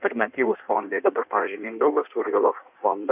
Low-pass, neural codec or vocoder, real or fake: 3.6 kHz; codec, 16 kHz in and 24 kHz out, 1.1 kbps, FireRedTTS-2 codec; fake